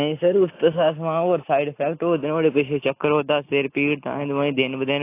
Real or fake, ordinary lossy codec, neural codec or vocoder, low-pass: real; AAC, 24 kbps; none; 3.6 kHz